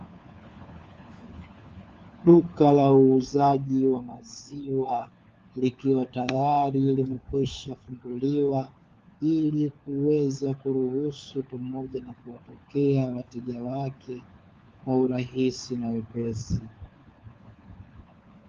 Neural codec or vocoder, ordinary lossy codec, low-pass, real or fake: codec, 16 kHz, 4 kbps, FunCodec, trained on LibriTTS, 50 frames a second; Opus, 32 kbps; 7.2 kHz; fake